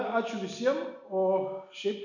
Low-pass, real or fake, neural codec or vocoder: 7.2 kHz; real; none